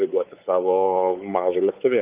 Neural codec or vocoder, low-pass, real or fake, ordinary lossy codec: codec, 16 kHz, 4 kbps, X-Codec, WavLM features, trained on Multilingual LibriSpeech; 3.6 kHz; fake; Opus, 24 kbps